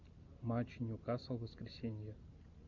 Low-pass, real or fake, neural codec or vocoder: 7.2 kHz; real; none